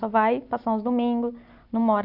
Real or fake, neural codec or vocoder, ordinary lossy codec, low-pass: real; none; none; 5.4 kHz